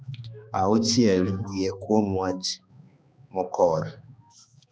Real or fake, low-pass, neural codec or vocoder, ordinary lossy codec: fake; none; codec, 16 kHz, 2 kbps, X-Codec, HuBERT features, trained on balanced general audio; none